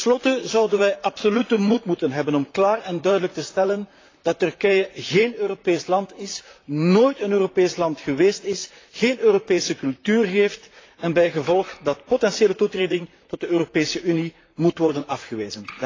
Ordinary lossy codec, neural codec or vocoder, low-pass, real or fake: AAC, 32 kbps; vocoder, 44.1 kHz, 128 mel bands, Pupu-Vocoder; 7.2 kHz; fake